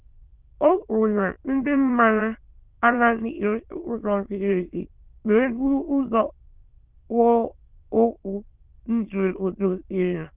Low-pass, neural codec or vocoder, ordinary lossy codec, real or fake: 3.6 kHz; autoencoder, 22.05 kHz, a latent of 192 numbers a frame, VITS, trained on many speakers; Opus, 32 kbps; fake